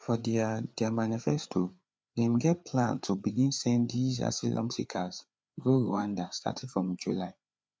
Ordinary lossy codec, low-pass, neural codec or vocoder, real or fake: none; none; codec, 16 kHz, 4 kbps, FreqCodec, larger model; fake